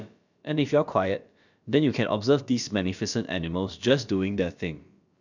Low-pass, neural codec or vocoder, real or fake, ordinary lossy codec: 7.2 kHz; codec, 16 kHz, about 1 kbps, DyCAST, with the encoder's durations; fake; none